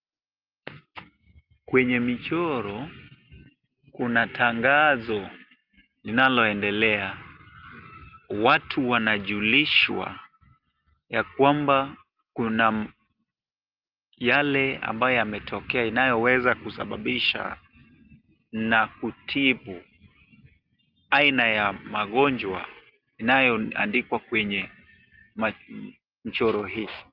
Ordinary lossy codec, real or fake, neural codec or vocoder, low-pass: Opus, 32 kbps; real; none; 5.4 kHz